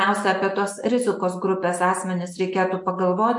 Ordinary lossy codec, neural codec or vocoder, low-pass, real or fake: MP3, 64 kbps; none; 10.8 kHz; real